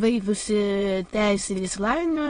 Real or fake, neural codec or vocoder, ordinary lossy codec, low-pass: fake; autoencoder, 22.05 kHz, a latent of 192 numbers a frame, VITS, trained on many speakers; AAC, 32 kbps; 9.9 kHz